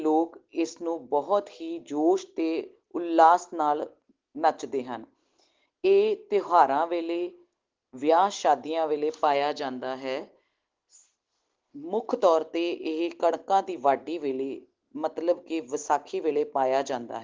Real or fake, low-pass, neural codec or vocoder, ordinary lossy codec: real; 7.2 kHz; none; Opus, 16 kbps